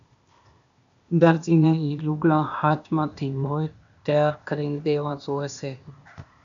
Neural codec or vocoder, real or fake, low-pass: codec, 16 kHz, 0.8 kbps, ZipCodec; fake; 7.2 kHz